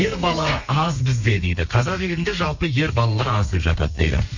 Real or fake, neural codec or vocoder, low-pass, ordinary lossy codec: fake; codec, 32 kHz, 1.9 kbps, SNAC; 7.2 kHz; Opus, 64 kbps